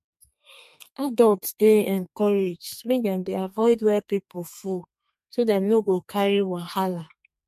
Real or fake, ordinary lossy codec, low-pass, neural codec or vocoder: fake; MP3, 64 kbps; 14.4 kHz; codec, 32 kHz, 1.9 kbps, SNAC